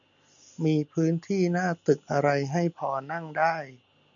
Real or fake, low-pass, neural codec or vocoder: real; 7.2 kHz; none